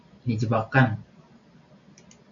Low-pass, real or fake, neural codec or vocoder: 7.2 kHz; real; none